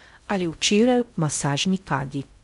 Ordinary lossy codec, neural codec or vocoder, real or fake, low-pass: MP3, 96 kbps; codec, 16 kHz in and 24 kHz out, 0.6 kbps, FocalCodec, streaming, 2048 codes; fake; 10.8 kHz